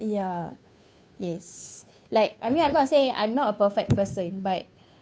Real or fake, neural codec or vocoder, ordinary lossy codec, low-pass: fake; codec, 16 kHz, 2 kbps, FunCodec, trained on Chinese and English, 25 frames a second; none; none